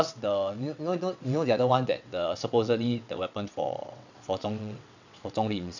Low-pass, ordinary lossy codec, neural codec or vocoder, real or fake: 7.2 kHz; none; vocoder, 22.05 kHz, 80 mel bands, WaveNeXt; fake